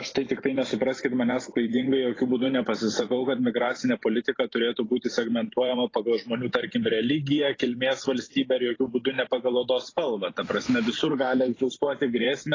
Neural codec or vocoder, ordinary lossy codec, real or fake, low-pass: vocoder, 44.1 kHz, 128 mel bands every 512 samples, BigVGAN v2; AAC, 32 kbps; fake; 7.2 kHz